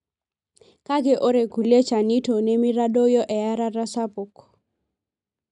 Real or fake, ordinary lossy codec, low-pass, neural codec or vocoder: real; none; 10.8 kHz; none